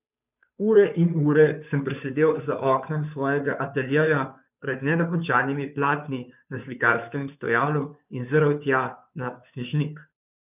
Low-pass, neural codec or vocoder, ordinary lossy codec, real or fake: 3.6 kHz; codec, 16 kHz, 2 kbps, FunCodec, trained on Chinese and English, 25 frames a second; none; fake